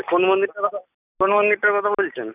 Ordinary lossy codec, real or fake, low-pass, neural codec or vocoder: none; real; 3.6 kHz; none